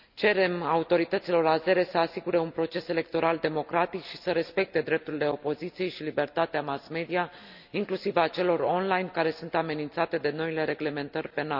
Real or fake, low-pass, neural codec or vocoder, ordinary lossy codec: real; 5.4 kHz; none; none